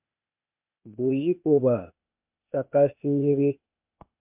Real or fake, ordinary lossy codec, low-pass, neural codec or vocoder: fake; MP3, 32 kbps; 3.6 kHz; codec, 16 kHz, 0.8 kbps, ZipCodec